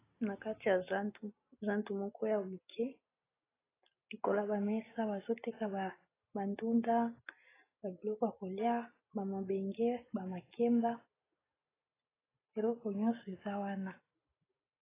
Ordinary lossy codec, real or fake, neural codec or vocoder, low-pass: AAC, 16 kbps; real; none; 3.6 kHz